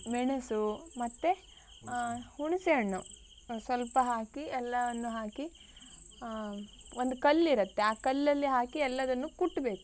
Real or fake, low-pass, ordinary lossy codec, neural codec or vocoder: real; none; none; none